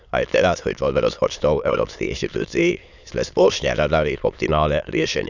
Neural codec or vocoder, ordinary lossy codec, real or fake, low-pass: autoencoder, 22.05 kHz, a latent of 192 numbers a frame, VITS, trained on many speakers; none; fake; 7.2 kHz